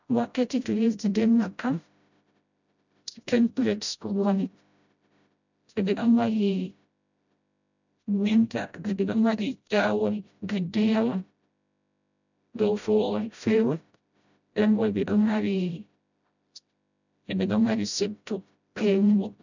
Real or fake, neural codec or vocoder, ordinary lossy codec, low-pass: fake; codec, 16 kHz, 0.5 kbps, FreqCodec, smaller model; none; 7.2 kHz